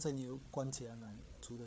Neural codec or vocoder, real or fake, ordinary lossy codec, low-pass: codec, 16 kHz, 8 kbps, FreqCodec, larger model; fake; none; none